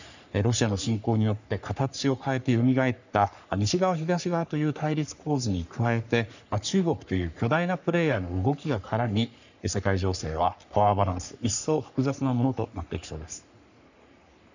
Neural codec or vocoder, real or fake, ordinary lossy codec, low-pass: codec, 44.1 kHz, 3.4 kbps, Pupu-Codec; fake; none; 7.2 kHz